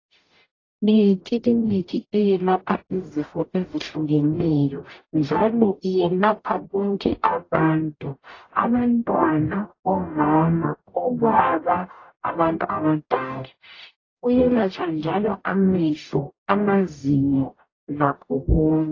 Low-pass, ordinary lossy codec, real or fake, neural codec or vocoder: 7.2 kHz; AAC, 32 kbps; fake; codec, 44.1 kHz, 0.9 kbps, DAC